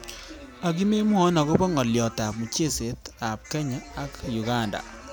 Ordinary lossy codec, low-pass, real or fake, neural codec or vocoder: none; none; real; none